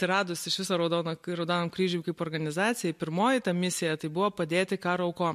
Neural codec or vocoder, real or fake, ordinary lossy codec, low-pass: none; real; MP3, 64 kbps; 14.4 kHz